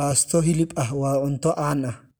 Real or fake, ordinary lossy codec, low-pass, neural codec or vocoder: real; none; none; none